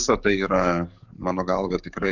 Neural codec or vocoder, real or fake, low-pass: codec, 44.1 kHz, 7.8 kbps, DAC; fake; 7.2 kHz